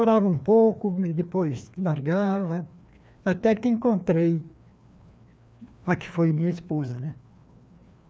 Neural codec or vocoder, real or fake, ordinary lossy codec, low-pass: codec, 16 kHz, 2 kbps, FreqCodec, larger model; fake; none; none